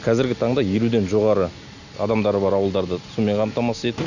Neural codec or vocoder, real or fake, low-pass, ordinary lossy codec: none; real; 7.2 kHz; none